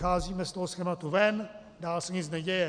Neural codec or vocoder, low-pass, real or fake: none; 9.9 kHz; real